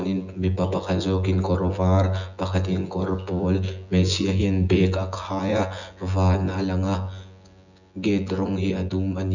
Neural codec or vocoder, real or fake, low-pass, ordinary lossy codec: vocoder, 24 kHz, 100 mel bands, Vocos; fake; 7.2 kHz; none